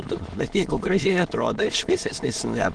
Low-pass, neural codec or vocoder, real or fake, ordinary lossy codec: 9.9 kHz; autoencoder, 22.05 kHz, a latent of 192 numbers a frame, VITS, trained on many speakers; fake; Opus, 16 kbps